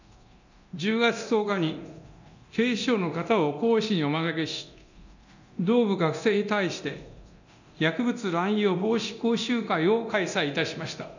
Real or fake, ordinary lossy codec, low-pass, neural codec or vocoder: fake; none; 7.2 kHz; codec, 24 kHz, 0.9 kbps, DualCodec